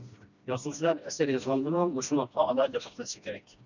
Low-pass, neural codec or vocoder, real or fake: 7.2 kHz; codec, 16 kHz, 1 kbps, FreqCodec, smaller model; fake